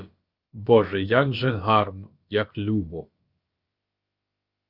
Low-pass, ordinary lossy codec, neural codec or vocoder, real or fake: 5.4 kHz; Opus, 24 kbps; codec, 16 kHz, about 1 kbps, DyCAST, with the encoder's durations; fake